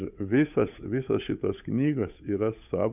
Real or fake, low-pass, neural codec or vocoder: fake; 3.6 kHz; codec, 16 kHz, 8 kbps, FunCodec, trained on Chinese and English, 25 frames a second